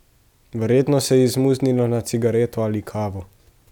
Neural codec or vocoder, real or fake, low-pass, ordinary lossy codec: none; real; 19.8 kHz; none